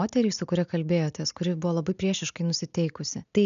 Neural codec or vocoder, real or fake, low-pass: none; real; 7.2 kHz